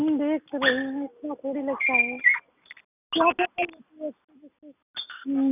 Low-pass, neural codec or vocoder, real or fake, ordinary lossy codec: 3.6 kHz; none; real; none